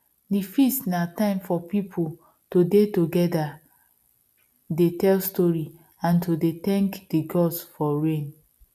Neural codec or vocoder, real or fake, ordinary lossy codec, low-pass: none; real; none; 14.4 kHz